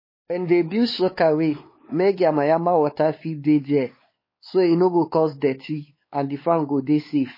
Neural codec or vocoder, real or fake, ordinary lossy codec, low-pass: codec, 16 kHz, 4 kbps, X-Codec, WavLM features, trained on Multilingual LibriSpeech; fake; MP3, 24 kbps; 5.4 kHz